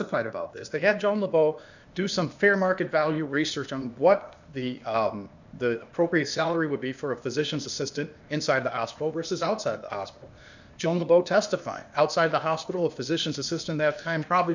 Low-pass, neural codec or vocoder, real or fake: 7.2 kHz; codec, 16 kHz, 0.8 kbps, ZipCodec; fake